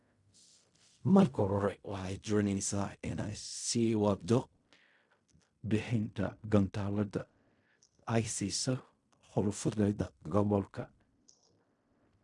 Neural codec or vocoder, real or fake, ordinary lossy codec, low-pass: codec, 16 kHz in and 24 kHz out, 0.4 kbps, LongCat-Audio-Codec, fine tuned four codebook decoder; fake; none; 10.8 kHz